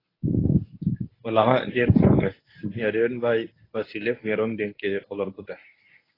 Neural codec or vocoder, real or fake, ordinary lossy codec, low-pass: codec, 24 kHz, 0.9 kbps, WavTokenizer, medium speech release version 1; fake; AAC, 24 kbps; 5.4 kHz